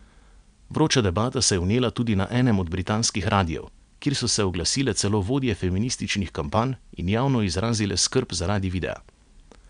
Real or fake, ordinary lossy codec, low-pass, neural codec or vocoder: real; none; 9.9 kHz; none